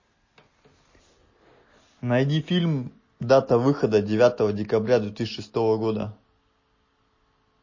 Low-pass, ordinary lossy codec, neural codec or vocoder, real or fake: 7.2 kHz; MP3, 32 kbps; none; real